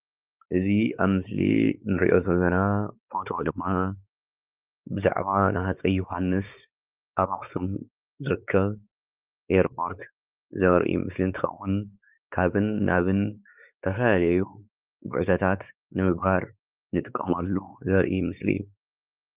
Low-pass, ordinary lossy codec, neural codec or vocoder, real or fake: 3.6 kHz; Opus, 24 kbps; codec, 16 kHz, 4 kbps, X-Codec, WavLM features, trained on Multilingual LibriSpeech; fake